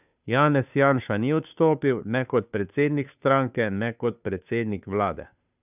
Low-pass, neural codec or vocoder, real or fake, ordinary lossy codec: 3.6 kHz; codec, 16 kHz, 2 kbps, FunCodec, trained on Chinese and English, 25 frames a second; fake; none